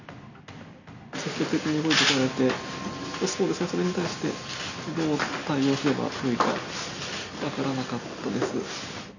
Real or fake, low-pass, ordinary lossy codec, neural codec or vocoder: real; 7.2 kHz; none; none